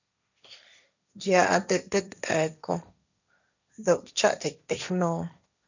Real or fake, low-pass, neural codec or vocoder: fake; 7.2 kHz; codec, 16 kHz, 1.1 kbps, Voila-Tokenizer